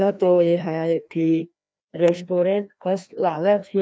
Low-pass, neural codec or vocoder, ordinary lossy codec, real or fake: none; codec, 16 kHz, 1 kbps, FreqCodec, larger model; none; fake